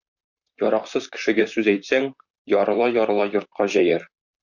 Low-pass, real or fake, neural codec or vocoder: 7.2 kHz; fake; vocoder, 44.1 kHz, 128 mel bands, Pupu-Vocoder